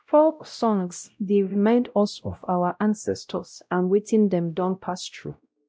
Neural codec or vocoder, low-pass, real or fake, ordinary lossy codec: codec, 16 kHz, 0.5 kbps, X-Codec, WavLM features, trained on Multilingual LibriSpeech; none; fake; none